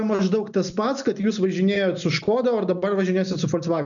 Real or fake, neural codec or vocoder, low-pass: real; none; 7.2 kHz